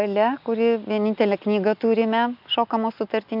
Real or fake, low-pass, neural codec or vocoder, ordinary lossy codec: real; 5.4 kHz; none; MP3, 48 kbps